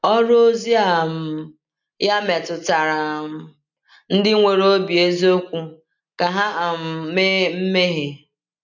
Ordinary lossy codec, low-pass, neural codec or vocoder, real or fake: none; 7.2 kHz; none; real